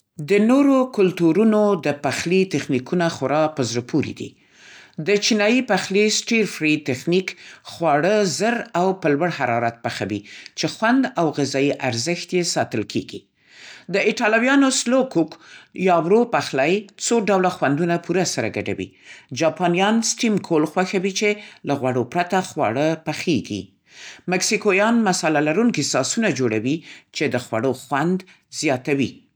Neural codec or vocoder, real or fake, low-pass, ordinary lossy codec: none; real; none; none